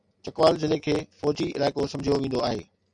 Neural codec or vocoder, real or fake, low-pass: none; real; 9.9 kHz